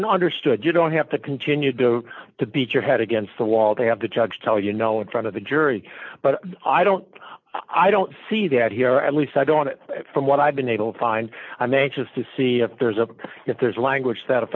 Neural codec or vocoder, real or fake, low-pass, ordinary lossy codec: none; real; 7.2 kHz; MP3, 48 kbps